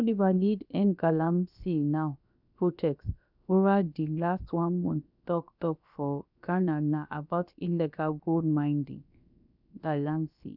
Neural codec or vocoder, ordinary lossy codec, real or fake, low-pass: codec, 16 kHz, about 1 kbps, DyCAST, with the encoder's durations; none; fake; 5.4 kHz